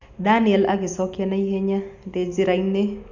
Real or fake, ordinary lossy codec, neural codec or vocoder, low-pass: real; none; none; 7.2 kHz